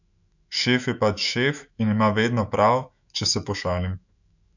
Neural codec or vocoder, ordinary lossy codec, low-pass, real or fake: autoencoder, 48 kHz, 128 numbers a frame, DAC-VAE, trained on Japanese speech; none; 7.2 kHz; fake